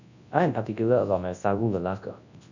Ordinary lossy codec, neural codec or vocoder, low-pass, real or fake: none; codec, 24 kHz, 0.9 kbps, WavTokenizer, large speech release; 7.2 kHz; fake